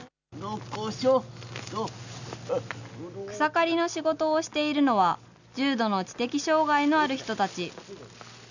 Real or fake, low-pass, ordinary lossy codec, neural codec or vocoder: real; 7.2 kHz; none; none